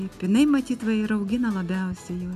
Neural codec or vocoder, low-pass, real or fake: none; 14.4 kHz; real